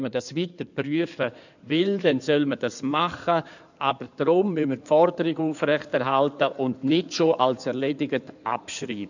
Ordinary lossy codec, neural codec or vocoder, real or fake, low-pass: none; codec, 16 kHz in and 24 kHz out, 2.2 kbps, FireRedTTS-2 codec; fake; 7.2 kHz